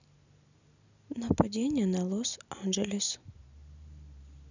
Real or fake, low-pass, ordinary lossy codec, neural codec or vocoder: real; 7.2 kHz; none; none